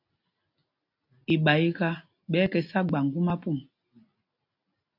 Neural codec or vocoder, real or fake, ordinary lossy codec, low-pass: none; real; AAC, 48 kbps; 5.4 kHz